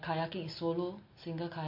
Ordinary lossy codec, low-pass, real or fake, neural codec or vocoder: MP3, 32 kbps; 5.4 kHz; real; none